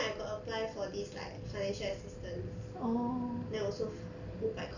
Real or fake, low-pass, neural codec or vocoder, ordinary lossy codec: real; 7.2 kHz; none; none